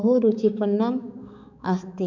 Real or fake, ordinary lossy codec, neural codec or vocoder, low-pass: fake; none; codec, 16 kHz, 4 kbps, X-Codec, HuBERT features, trained on balanced general audio; 7.2 kHz